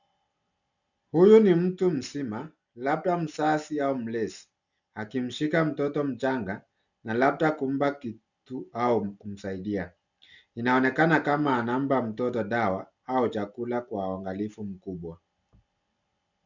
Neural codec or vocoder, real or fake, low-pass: none; real; 7.2 kHz